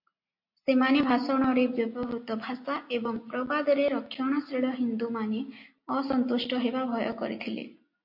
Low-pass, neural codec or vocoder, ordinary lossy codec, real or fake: 5.4 kHz; none; MP3, 32 kbps; real